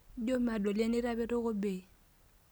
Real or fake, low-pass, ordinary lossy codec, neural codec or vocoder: real; none; none; none